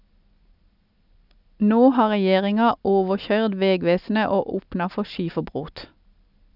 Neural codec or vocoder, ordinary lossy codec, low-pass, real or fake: none; none; 5.4 kHz; real